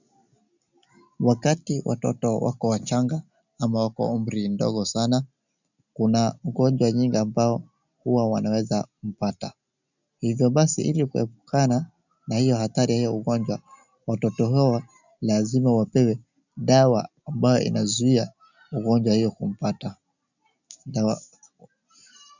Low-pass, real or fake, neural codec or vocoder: 7.2 kHz; real; none